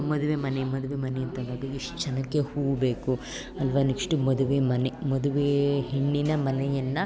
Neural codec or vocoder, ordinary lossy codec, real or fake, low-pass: none; none; real; none